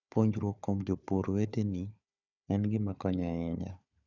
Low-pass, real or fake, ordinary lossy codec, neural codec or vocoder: 7.2 kHz; fake; none; codec, 16 kHz, 4 kbps, FunCodec, trained on Chinese and English, 50 frames a second